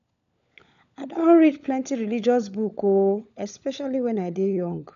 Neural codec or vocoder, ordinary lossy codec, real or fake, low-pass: codec, 16 kHz, 16 kbps, FunCodec, trained on LibriTTS, 50 frames a second; none; fake; 7.2 kHz